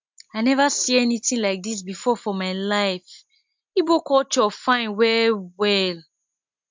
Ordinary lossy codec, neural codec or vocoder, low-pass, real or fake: MP3, 64 kbps; none; 7.2 kHz; real